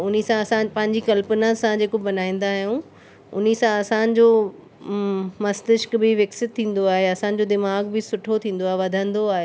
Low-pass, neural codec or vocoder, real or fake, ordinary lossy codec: none; none; real; none